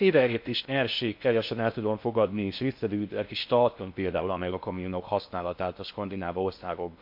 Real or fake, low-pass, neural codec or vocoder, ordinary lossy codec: fake; 5.4 kHz; codec, 16 kHz in and 24 kHz out, 0.6 kbps, FocalCodec, streaming, 2048 codes; MP3, 48 kbps